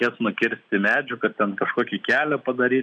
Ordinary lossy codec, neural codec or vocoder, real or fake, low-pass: MP3, 96 kbps; none; real; 9.9 kHz